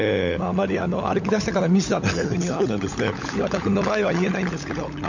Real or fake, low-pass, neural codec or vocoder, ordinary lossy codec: fake; 7.2 kHz; codec, 16 kHz, 16 kbps, FunCodec, trained on LibriTTS, 50 frames a second; none